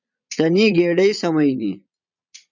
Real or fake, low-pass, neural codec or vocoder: fake; 7.2 kHz; vocoder, 22.05 kHz, 80 mel bands, Vocos